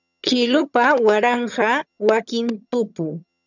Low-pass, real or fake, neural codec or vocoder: 7.2 kHz; fake; vocoder, 22.05 kHz, 80 mel bands, HiFi-GAN